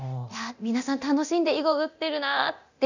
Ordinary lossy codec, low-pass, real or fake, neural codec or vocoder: none; 7.2 kHz; fake; codec, 24 kHz, 0.9 kbps, DualCodec